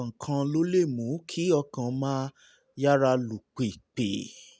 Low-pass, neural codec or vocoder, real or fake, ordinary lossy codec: none; none; real; none